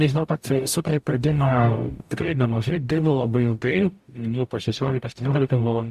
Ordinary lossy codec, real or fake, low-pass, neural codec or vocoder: Opus, 64 kbps; fake; 14.4 kHz; codec, 44.1 kHz, 0.9 kbps, DAC